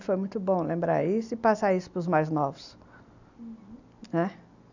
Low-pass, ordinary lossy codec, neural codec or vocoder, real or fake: 7.2 kHz; none; none; real